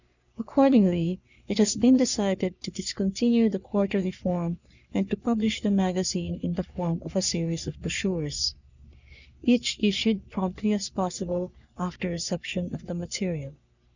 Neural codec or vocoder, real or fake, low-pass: codec, 44.1 kHz, 3.4 kbps, Pupu-Codec; fake; 7.2 kHz